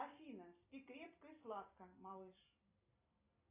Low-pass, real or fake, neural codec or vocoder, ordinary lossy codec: 3.6 kHz; real; none; AAC, 32 kbps